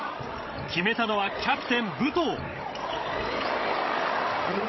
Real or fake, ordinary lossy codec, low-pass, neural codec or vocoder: fake; MP3, 24 kbps; 7.2 kHz; codec, 16 kHz, 16 kbps, FreqCodec, larger model